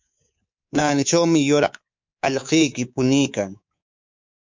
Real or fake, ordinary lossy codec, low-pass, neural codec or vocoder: fake; MP3, 64 kbps; 7.2 kHz; codec, 24 kHz, 3.1 kbps, DualCodec